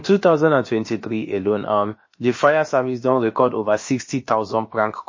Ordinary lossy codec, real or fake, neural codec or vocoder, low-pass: MP3, 32 kbps; fake; codec, 16 kHz, about 1 kbps, DyCAST, with the encoder's durations; 7.2 kHz